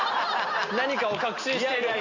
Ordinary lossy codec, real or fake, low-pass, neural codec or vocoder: Opus, 64 kbps; real; 7.2 kHz; none